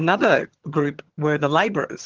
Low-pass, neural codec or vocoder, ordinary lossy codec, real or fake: 7.2 kHz; vocoder, 22.05 kHz, 80 mel bands, HiFi-GAN; Opus, 16 kbps; fake